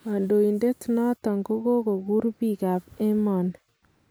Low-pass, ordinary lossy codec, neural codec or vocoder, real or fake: none; none; none; real